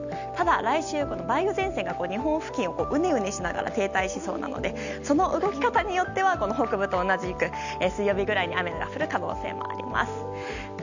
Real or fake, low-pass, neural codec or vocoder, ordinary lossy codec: real; 7.2 kHz; none; none